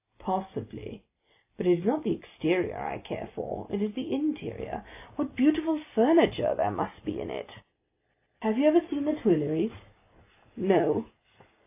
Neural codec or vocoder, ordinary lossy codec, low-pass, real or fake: none; AAC, 32 kbps; 3.6 kHz; real